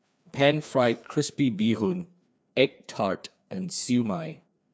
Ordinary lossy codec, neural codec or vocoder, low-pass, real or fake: none; codec, 16 kHz, 2 kbps, FreqCodec, larger model; none; fake